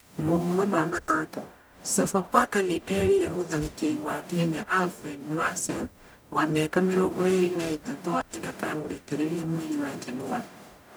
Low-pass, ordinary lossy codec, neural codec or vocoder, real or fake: none; none; codec, 44.1 kHz, 0.9 kbps, DAC; fake